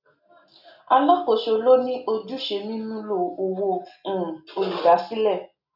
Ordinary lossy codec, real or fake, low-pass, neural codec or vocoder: none; real; 5.4 kHz; none